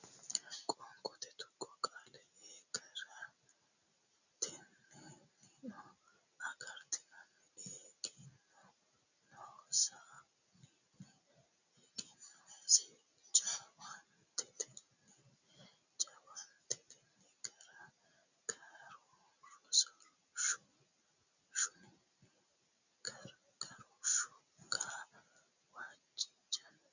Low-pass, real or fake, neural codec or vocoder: 7.2 kHz; real; none